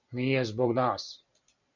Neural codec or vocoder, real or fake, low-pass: none; real; 7.2 kHz